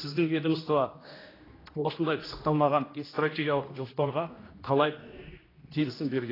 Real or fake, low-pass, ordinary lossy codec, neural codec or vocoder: fake; 5.4 kHz; AAC, 24 kbps; codec, 16 kHz, 1 kbps, X-Codec, HuBERT features, trained on general audio